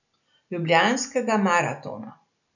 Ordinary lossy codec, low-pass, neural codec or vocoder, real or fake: none; 7.2 kHz; none; real